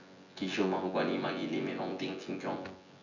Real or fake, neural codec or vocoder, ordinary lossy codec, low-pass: fake; vocoder, 24 kHz, 100 mel bands, Vocos; none; 7.2 kHz